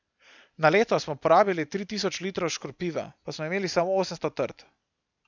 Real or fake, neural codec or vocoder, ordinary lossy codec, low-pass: real; none; none; 7.2 kHz